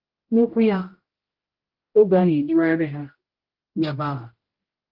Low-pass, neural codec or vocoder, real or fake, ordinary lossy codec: 5.4 kHz; codec, 16 kHz, 0.5 kbps, X-Codec, HuBERT features, trained on general audio; fake; Opus, 16 kbps